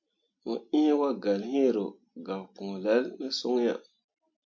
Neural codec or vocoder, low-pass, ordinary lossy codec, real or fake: none; 7.2 kHz; MP3, 48 kbps; real